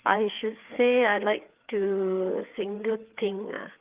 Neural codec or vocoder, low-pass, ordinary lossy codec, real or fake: codec, 16 kHz, 4 kbps, FreqCodec, larger model; 3.6 kHz; Opus, 24 kbps; fake